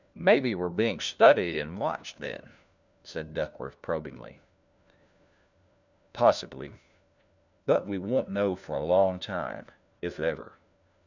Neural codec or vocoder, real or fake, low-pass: codec, 16 kHz, 1 kbps, FunCodec, trained on LibriTTS, 50 frames a second; fake; 7.2 kHz